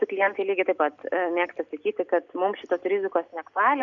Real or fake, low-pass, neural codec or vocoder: real; 7.2 kHz; none